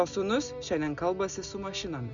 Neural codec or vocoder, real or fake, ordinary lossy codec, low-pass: none; real; AAC, 64 kbps; 7.2 kHz